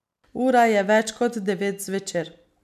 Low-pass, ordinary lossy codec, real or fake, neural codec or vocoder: 14.4 kHz; none; real; none